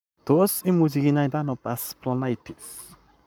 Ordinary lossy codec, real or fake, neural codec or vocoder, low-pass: none; fake; codec, 44.1 kHz, 7.8 kbps, Pupu-Codec; none